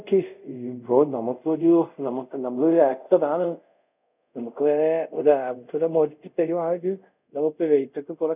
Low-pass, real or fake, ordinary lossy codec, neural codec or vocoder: 3.6 kHz; fake; none; codec, 24 kHz, 0.5 kbps, DualCodec